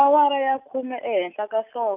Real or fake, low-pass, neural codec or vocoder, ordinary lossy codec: real; 3.6 kHz; none; none